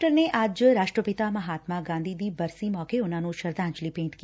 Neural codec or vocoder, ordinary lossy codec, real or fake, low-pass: none; none; real; none